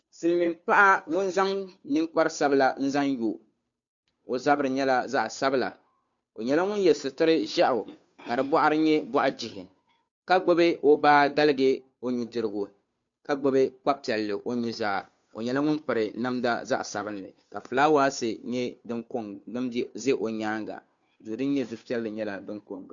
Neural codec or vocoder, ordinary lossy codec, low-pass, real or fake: codec, 16 kHz, 2 kbps, FunCodec, trained on Chinese and English, 25 frames a second; MP3, 64 kbps; 7.2 kHz; fake